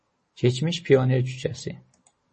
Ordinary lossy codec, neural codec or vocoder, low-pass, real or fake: MP3, 32 kbps; none; 10.8 kHz; real